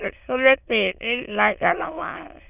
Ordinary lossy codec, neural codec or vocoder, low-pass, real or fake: none; autoencoder, 22.05 kHz, a latent of 192 numbers a frame, VITS, trained on many speakers; 3.6 kHz; fake